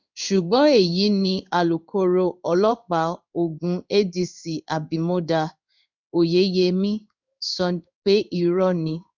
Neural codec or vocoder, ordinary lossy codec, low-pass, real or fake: codec, 16 kHz in and 24 kHz out, 1 kbps, XY-Tokenizer; none; 7.2 kHz; fake